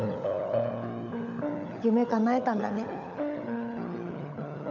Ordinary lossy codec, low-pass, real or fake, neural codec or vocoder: none; 7.2 kHz; fake; codec, 16 kHz, 16 kbps, FunCodec, trained on LibriTTS, 50 frames a second